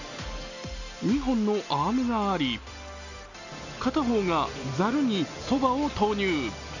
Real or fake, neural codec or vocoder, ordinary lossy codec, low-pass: real; none; none; 7.2 kHz